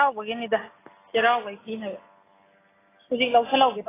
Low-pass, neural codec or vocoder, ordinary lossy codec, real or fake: 3.6 kHz; none; AAC, 16 kbps; real